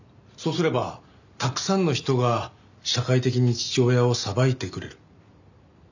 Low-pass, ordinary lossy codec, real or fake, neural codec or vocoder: 7.2 kHz; none; real; none